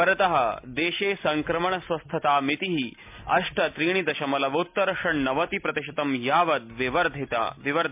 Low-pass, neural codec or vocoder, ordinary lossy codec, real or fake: 3.6 kHz; none; MP3, 32 kbps; real